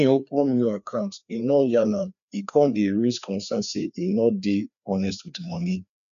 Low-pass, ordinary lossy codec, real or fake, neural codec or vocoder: 7.2 kHz; none; fake; codec, 16 kHz, 2 kbps, FreqCodec, larger model